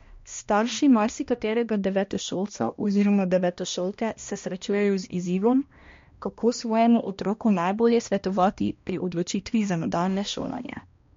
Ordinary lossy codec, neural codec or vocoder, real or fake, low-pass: MP3, 48 kbps; codec, 16 kHz, 1 kbps, X-Codec, HuBERT features, trained on balanced general audio; fake; 7.2 kHz